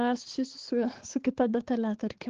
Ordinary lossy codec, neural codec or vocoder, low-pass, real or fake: Opus, 16 kbps; codec, 16 kHz, 4 kbps, X-Codec, HuBERT features, trained on balanced general audio; 7.2 kHz; fake